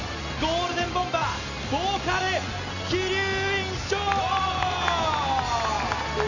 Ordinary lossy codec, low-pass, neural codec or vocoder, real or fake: none; 7.2 kHz; none; real